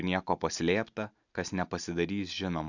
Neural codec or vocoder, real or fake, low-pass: none; real; 7.2 kHz